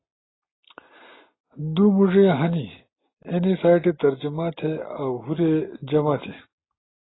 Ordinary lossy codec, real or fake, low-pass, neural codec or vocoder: AAC, 16 kbps; real; 7.2 kHz; none